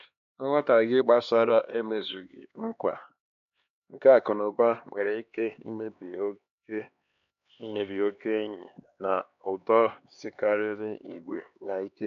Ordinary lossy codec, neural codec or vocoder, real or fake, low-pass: none; codec, 16 kHz, 2 kbps, X-Codec, HuBERT features, trained on LibriSpeech; fake; 7.2 kHz